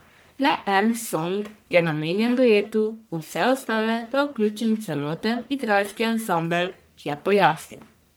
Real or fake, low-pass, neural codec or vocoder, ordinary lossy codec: fake; none; codec, 44.1 kHz, 1.7 kbps, Pupu-Codec; none